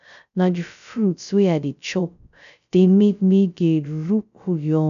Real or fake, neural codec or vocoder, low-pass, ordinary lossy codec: fake; codec, 16 kHz, 0.2 kbps, FocalCodec; 7.2 kHz; none